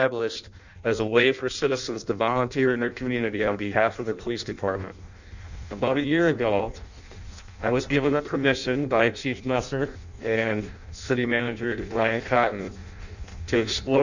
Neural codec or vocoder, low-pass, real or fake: codec, 16 kHz in and 24 kHz out, 0.6 kbps, FireRedTTS-2 codec; 7.2 kHz; fake